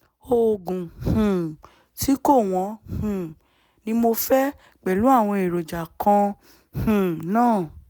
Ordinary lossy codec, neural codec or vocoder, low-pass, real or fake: none; none; none; real